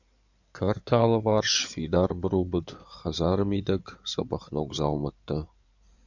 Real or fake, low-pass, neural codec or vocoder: fake; 7.2 kHz; codec, 16 kHz in and 24 kHz out, 2.2 kbps, FireRedTTS-2 codec